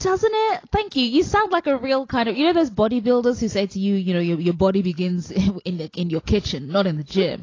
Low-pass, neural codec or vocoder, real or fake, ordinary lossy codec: 7.2 kHz; none; real; AAC, 32 kbps